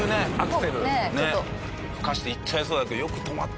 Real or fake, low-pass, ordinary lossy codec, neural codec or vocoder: real; none; none; none